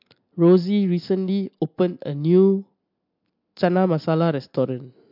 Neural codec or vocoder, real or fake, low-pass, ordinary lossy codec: none; real; 5.4 kHz; MP3, 48 kbps